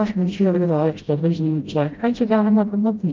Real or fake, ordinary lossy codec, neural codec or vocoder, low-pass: fake; Opus, 32 kbps; codec, 16 kHz, 0.5 kbps, FreqCodec, smaller model; 7.2 kHz